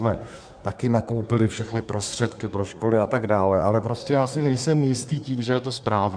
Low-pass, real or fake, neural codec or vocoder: 9.9 kHz; fake; codec, 24 kHz, 1 kbps, SNAC